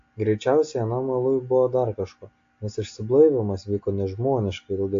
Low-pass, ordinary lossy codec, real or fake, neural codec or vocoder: 7.2 kHz; AAC, 48 kbps; real; none